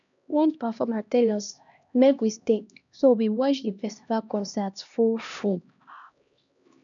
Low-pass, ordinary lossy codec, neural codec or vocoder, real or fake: 7.2 kHz; none; codec, 16 kHz, 1 kbps, X-Codec, HuBERT features, trained on LibriSpeech; fake